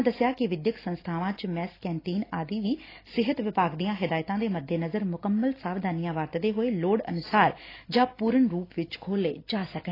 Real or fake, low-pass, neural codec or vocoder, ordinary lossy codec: real; 5.4 kHz; none; AAC, 24 kbps